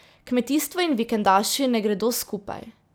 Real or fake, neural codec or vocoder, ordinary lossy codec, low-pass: real; none; none; none